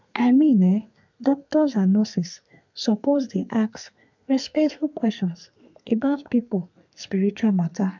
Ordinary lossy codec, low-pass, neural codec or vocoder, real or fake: MP3, 64 kbps; 7.2 kHz; codec, 32 kHz, 1.9 kbps, SNAC; fake